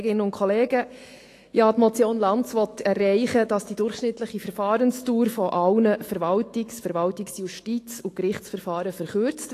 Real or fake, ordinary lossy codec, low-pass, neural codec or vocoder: fake; AAC, 48 kbps; 14.4 kHz; autoencoder, 48 kHz, 128 numbers a frame, DAC-VAE, trained on Japanese speech